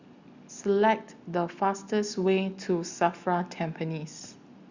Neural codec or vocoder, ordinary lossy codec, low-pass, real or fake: none; Opus, 64 kbps; 7.2 kHz; real